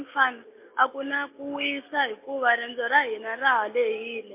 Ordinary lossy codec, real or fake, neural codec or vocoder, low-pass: MP3, 24 kbps; real; none; 3.6 kHz